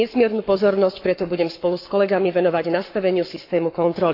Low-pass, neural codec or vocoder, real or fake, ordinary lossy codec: 5.4 kHz; codec, 44.1 kHz, 7.8 kbps, Pupu-Codec; fake; AAC, 32 kbps